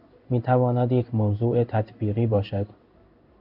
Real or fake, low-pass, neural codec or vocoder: fake; 5.4 kHz; codec, 16 kHz in and 24 kHz out, 1 kbps, XY-Tokenizer